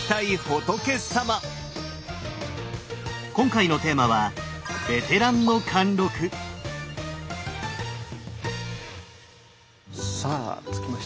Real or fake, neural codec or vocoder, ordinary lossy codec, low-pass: real; none; none; none